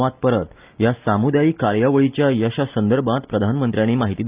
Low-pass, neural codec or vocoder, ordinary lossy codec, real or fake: 3.6 kHz; none; Opus, 24 kbps; real